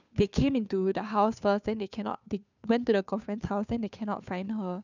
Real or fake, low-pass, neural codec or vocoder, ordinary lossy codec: fake; 7.2 kHz; codec, 16 kHz, 2 kbps, FunCodec, trained on Chinese and English, 25 frames a second; none